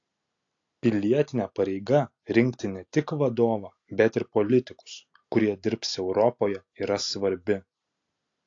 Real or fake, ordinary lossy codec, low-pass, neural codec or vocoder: real; AAC, 48 kbps; 7.2 kHz; none